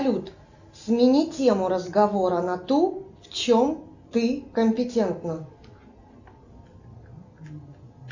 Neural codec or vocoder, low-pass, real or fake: none; 7.2 kHz; real